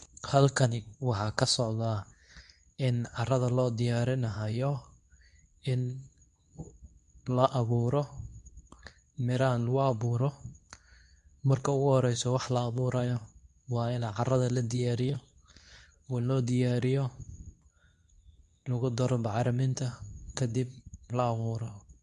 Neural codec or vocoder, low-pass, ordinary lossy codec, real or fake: codec, 24 kHz, 0.9 kbps, WavTokenizer, medium speech release version 2; 10.8 kHz; none; fake